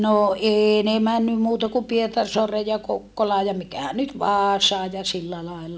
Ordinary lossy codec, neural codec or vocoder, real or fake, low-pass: none; none; real; none